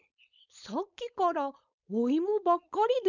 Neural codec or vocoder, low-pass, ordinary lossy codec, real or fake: codec, 16 kHz, 4.8 kbps, FACodec; 7.2 kHz; none; fake